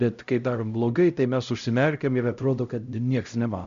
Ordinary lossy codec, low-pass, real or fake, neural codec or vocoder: Opus, 64 kbps; 7.2 kHz; fake; codec, 16 kHz, 0.5 kbps, X-Codec, HuBERT features, trained on LibriSpeech